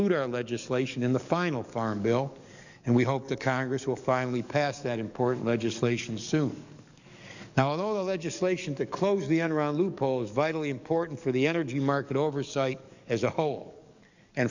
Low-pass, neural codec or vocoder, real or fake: 7.2 kHz; codec, 44.1 kHz, 7.8 kbps, DAC; fake